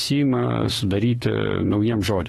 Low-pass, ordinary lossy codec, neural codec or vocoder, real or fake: 19.8 kHz; AAC, 32 kbps; autoencoder, 48 kHz, 32 numbers a frame, DAC-VAE, trained on Japanese speech; fake